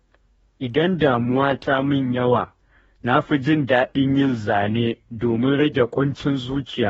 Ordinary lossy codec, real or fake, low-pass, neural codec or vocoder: AAC, 24 kbps; fake; 19.8 kHz; codec, 44.1 kHz, 2.6 kbps, DAC